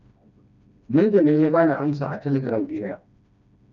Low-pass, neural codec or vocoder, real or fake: 7.2 kHz; codec, 16 kHz, 1 kbps, FreqCodec, smaller model; fake